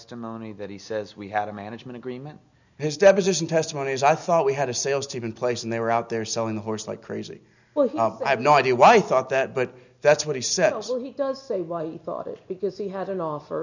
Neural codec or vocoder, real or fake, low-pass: none; real; 7.2 kHz